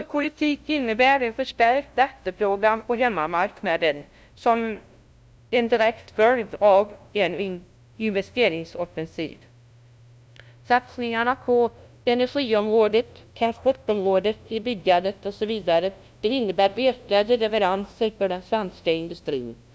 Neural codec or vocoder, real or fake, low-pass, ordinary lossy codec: codec, 16 kHz, 0.5 kbps, FunCodec, trained on LibriTTS, 25 frames a second; fake; none; none